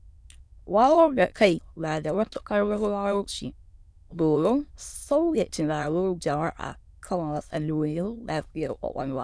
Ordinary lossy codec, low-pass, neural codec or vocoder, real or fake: none; none; autoencoder, 22.05 kHz, a latent of 192 numbers a frame, VITS, trained on many speakers; fake